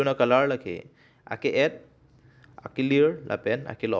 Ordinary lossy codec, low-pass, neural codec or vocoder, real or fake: none; none; none; real